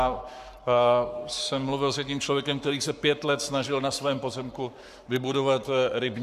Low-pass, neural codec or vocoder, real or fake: 14.4 kHz; codec, 44.1 kHz, 7.8 kbps, Pupu-Codec; fake